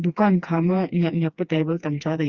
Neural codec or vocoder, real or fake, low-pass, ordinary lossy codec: codec, 16 kHz, 2 kbps, FreqCodec, smaller model; fake; 7.2 kHz; Opus, 64 kbps